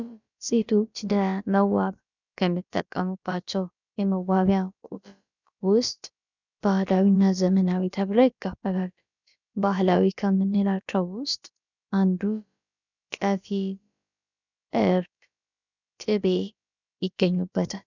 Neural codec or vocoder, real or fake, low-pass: codec, 16 kHz, about 1 kbps, DyCAST, with the encoder's durations; fake; 7.2 kHz